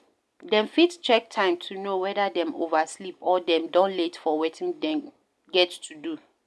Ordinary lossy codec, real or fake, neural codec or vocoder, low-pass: none; real; none; none